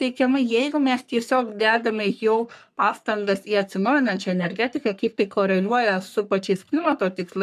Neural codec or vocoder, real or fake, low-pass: codec, 44.1 kHz, 3.4 kbps, Pupu-Codec; fake; 14.4 kHz